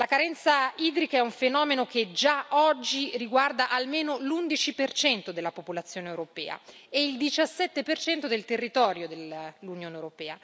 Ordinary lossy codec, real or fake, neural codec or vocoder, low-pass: none; real; none; none